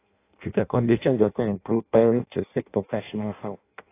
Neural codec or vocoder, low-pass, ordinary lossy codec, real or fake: codec, 16 kHz in and 24 kHz out, 0.6 kbps, FireRedTTS-2 codec; 3.6 kHz; AAC, 24 kbps; fake